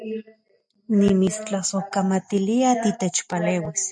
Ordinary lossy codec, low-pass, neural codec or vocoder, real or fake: MP3, 96 kbps; 9.9 kHz; none; real